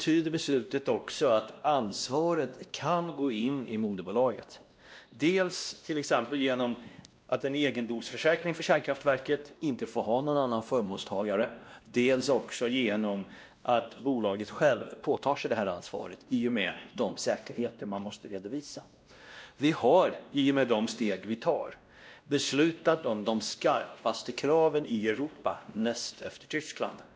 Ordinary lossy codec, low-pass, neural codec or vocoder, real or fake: none; none; codec, 16 kHz, 1 kbps, X-Codec, WavLM features, trained on Multilingual LibriSpeech; fake